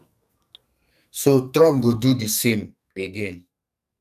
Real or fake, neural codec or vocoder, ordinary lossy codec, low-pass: fake; codec, 44.1 kHz, 2.6 kbps, SNAC; none; 14.4 kHz